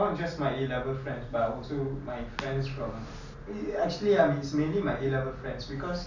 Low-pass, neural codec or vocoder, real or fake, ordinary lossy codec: 7.2 kHz; none; real; none